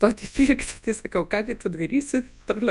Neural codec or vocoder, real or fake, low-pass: codec, 24 kHz, 0.9 kbps, WavTokenizer, large speech release; fake; 10.8 kHz